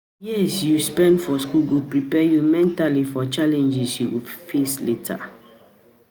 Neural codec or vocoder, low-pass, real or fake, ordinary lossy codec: none; none; real; none